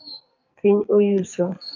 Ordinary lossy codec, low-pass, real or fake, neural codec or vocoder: AAC, 48 kbps; 7.2 kHz; fake; codec, 44.1 kHz, 2.6 kbps, SNAC